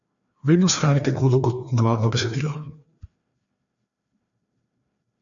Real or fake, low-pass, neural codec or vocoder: fake; 7.2 kHz; codec, 16 kHz, 2 kbps, FreqCodec, larger model